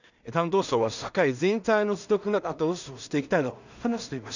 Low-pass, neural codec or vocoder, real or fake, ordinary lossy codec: 7.2 kHz; codec, 16 kHz in and 24 kHz out, 0.4 kbps, LongCat-Audio-Codec, two codebook decoder; fake; none